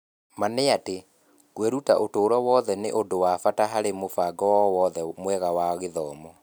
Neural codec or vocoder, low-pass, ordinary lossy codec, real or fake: none; none; none; real